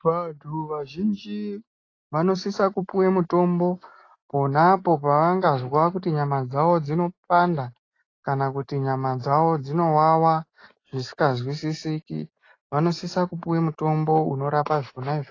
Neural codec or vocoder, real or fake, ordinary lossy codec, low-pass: none; real; AAC, 32 kbps; 7.2 kHz